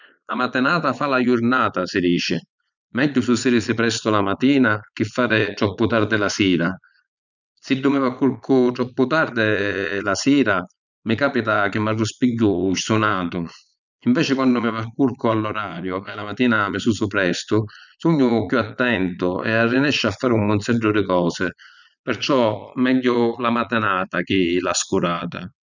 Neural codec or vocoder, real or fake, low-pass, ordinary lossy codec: vocoder, 22.05 kHz, 80 mel bands, Vocos; fake; 7.2 kHz; none